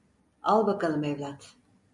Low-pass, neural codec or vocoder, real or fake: 10.8 kHz; none; real